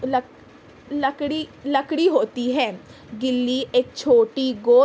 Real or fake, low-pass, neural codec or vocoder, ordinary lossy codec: real; none; none; none